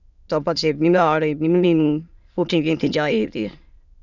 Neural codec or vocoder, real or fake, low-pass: autoencoder, 22.05 kHz, a latent of 192 numbers a frame, VITS, trained on many speakers; fake; 7.2 kHz